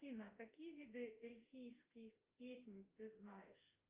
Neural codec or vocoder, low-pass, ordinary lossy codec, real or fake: autoencoder, 48 kHz, 32 numbers a frame, DAC-VAE, trained on Japanese speech; 3.6 kHz; Opus, 24 kbps; fake